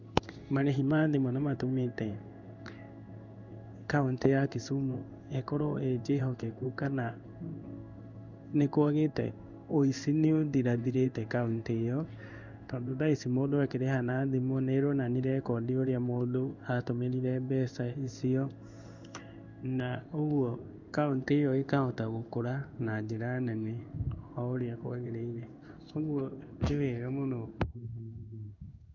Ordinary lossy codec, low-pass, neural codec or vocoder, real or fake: none; 7.2 kHz; codec, 16 kHz in and 24 kHz out, 1 kbps, XY-Tokenizer; fake